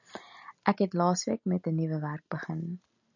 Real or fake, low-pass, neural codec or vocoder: real; 7.2 kHz; none